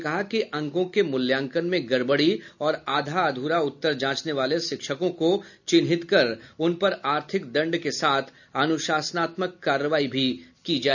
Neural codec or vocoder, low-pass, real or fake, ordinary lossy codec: none; 7.2 kHz; real; none